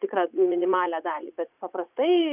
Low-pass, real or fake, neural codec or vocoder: 3.6 kHz; fake; vocoder, 44.1 kHz, 80 mel bands, Vocos